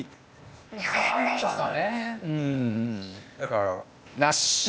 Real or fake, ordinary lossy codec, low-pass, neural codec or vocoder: fake; none; none; codec, 16 kHz, 0.8 kbps, ZipCodec